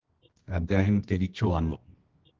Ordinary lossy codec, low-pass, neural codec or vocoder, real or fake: Opus, 16 kbps; 7.2 kHz; codec, 24 kHz, 0.9 kbps, WavTokenizer, medium music audio release; fake